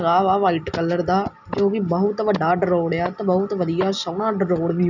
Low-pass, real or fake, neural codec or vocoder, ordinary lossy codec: 7.2 kHz; real; none; none